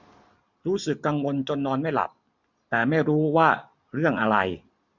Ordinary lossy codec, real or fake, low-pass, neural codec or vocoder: none; real; 7.2 kHz; none